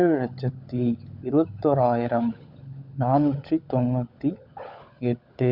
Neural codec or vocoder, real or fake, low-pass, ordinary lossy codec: codec, 16 kHz, 16 kbps, FunCodec, trained on LibriTTS, 50 frames a second; fake; 5.4 kHz; none